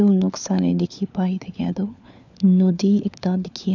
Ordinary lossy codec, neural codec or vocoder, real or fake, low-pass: none; codec, 16 kHz, 4 kbps, FunCodec, trained on LibriTTS, 50 frames a second; fake; 7.2 kHz